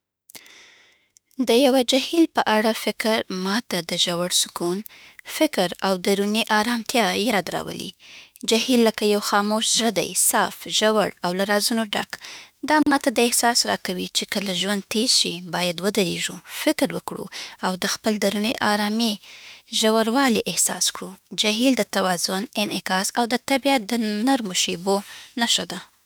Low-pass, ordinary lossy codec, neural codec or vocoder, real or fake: none; none; autoencoder, 48 kHz, 32 numbers a frame, DAC-VAE, trained on Japanese speech; fake